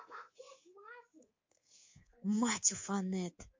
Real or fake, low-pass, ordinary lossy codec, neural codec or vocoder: fake; 7.2 kHz; MP3, 48 kbps; codec, 24 kHz, 3.1 kbps, DualCodec